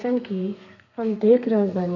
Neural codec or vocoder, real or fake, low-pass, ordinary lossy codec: codec, 32 kHz, 1.9 kbps, SNAC; fake; 7.2 kHz; none